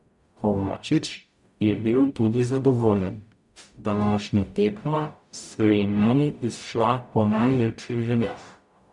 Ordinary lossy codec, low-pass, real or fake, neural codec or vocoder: none; 10.8 kHz; fake; codec, 44.1 kHz, 0.9 kbps, DAC